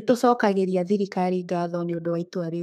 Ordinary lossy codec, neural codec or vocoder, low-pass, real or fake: none; codec, 32 kHz, 1.9 kbps, SNAC; 14.4 kHz; fake